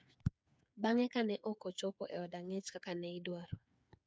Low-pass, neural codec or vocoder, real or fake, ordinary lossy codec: none; codec, 16 kHz, 8 kbps, FreqCodec, smaller model; fake; none